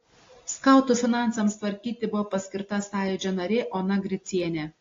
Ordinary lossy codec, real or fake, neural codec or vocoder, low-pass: AAC, 32 kbps; real; none; 7.2 kHz